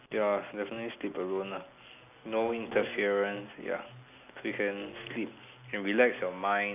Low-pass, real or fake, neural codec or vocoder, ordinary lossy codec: 3.6 kHz; real; none; none